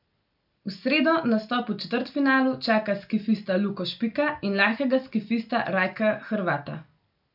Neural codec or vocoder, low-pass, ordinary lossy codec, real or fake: none; 5.4 kHz; none; real